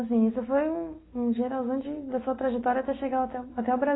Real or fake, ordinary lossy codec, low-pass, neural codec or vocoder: real; AAC, 16 kbps; 7.2 kHz; none